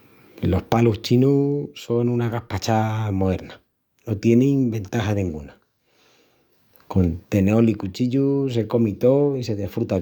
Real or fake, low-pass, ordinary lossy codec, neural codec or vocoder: fake; 19.8 kHz; none; codec, 44.1 kHz, 7.8 kbps, DAC